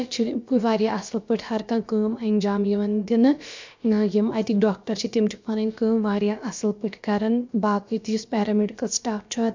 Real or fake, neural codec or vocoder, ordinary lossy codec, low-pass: fake; codec, 16 kHz, about 1 kbps, DyCAST, with the encoder's durations; MP3, 64 kbps; 7.2 kHz